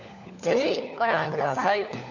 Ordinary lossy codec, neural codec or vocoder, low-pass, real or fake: none; codec, 16 kHz, 4 kbps, FunCodec, trained on LibriTTS, 50 frames a second; 7.2 kHz; fake